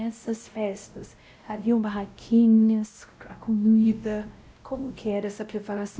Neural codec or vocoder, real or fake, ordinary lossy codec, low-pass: codec, 16 kHz, 0.5 kbps, X-Codec, WavLM features, trained on Multilingual LibriSpeech; fake; none; none